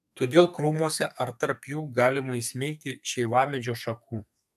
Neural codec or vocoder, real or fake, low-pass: codec, 32 kHz, 1.9 kbps, SNAC; fake; 14.4 kHz